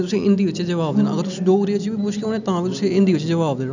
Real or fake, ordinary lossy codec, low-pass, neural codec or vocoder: real; none; 7.2 kHz; none